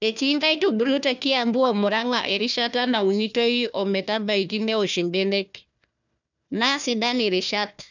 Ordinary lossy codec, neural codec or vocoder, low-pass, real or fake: none; codec, 16 kHz, 1 kbps, FunCodec, trained on Chinese and English, 50 frames a second; 7.2 kHz; fake